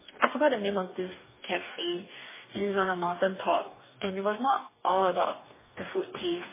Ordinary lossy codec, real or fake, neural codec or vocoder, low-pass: MP3, 16 kbps; fake; codec, 44.1 kHz, 2.6 kbps, DAC; 3.6 kHz